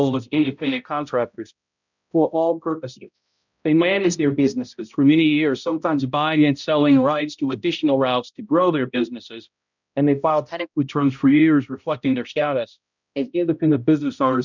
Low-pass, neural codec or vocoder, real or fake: 7.2 kHz; codec, 16 kHz, 0.5 kbps, X-Codec, HuBERT features, trained on balanced general audio; fake